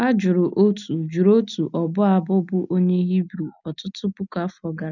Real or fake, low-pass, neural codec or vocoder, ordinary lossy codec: real; 7.2 kHz; none; none